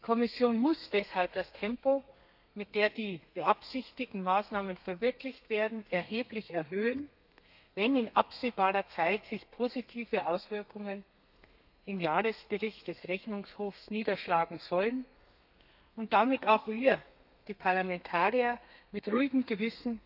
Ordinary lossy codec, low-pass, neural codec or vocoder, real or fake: none; 5.4 kHz; codec, 32 kHz, 1.9 kbps, SNAC; fake